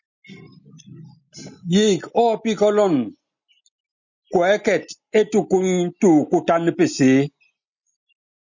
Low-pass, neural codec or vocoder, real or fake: 7.2 kHz; none; real